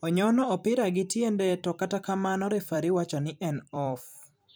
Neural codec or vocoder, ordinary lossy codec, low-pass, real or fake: vocoder, 44.1 kHz, 128 mel bands every 256 samples, BigVGAN v2; none; none; fake